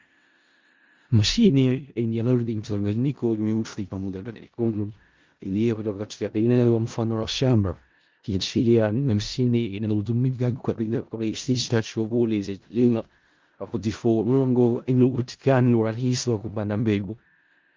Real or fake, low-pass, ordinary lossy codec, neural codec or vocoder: fake; 7.2 kHz; Opus, 32 kbps; codec, 16 kHz in and 24 kHz out, 0.4 kbps, LongCat-Audio-Codec, four codebook decoder